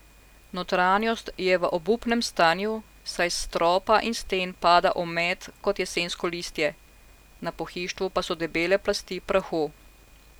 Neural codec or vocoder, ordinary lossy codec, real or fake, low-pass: none; none; real; none